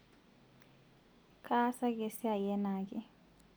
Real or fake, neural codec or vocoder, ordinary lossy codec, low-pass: real; none; none; none